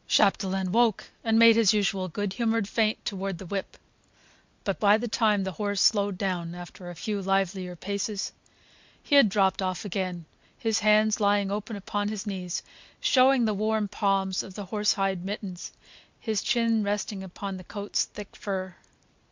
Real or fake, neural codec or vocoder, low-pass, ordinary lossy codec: real; none; 7.2 kHz; MP3, 64 kbps